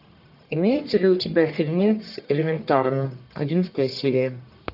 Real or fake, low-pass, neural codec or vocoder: fake; 5.4 kHz; codec, 44.1 kHz, 1.7 kbps, Pupu-Codec